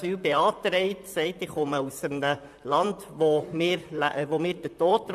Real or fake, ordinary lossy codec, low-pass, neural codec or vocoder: fake; AAC, 96 kbps; 14.4 kHz; vocoder, 44.1 kHz, 128 mel bands, Pupu-Vocoder